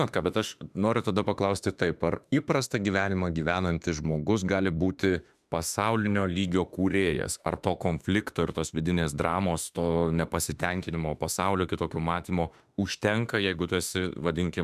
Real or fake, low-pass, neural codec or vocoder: fake; 14.4 kHz; autoencoder, 48 kHz, 32 numbers a frame, DAC-VAE, trained on Japanese speech